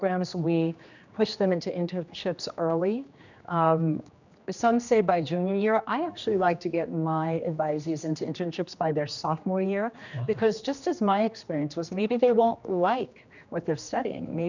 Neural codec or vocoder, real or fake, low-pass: codec, 16 kHz, 2 kbps, X-Codec, HuBERT features, trained on general audio; fake; 7.2 kHz